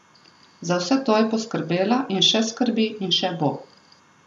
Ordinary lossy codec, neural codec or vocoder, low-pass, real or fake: none; none; none; real